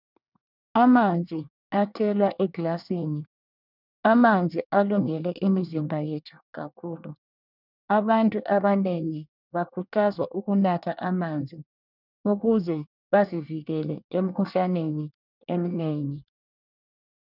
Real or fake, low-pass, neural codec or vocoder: fake; 5.4 kHz; codec, 24 kHz, 1 kbps, SNAC